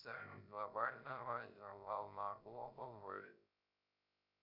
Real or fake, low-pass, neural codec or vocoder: fake; 5.4 kHz; codec, 16 kHz, 0.3 kbps, FocalCodec